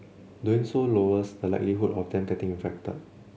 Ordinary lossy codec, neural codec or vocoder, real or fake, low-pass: none; none; real; none